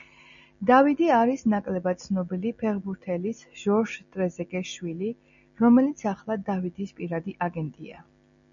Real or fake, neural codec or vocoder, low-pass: real; none; 7.2 kHz